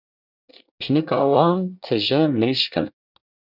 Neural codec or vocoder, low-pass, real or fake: codec, 24 kHz, 1 kbps, SNAC; 5.4 kHz; fake